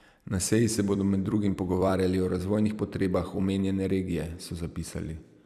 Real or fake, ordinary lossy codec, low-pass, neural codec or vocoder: fake; none; 14.4 kHz; vocoder, 44.1 kHz, 128 mel bands every 256 samples, BigVGAN v2